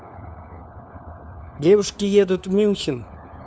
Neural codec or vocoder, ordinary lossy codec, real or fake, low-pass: codec, 16 kHz, 4 kbps, FunCodec, trained on LibriTTS, 50 frames a second; none; fake; none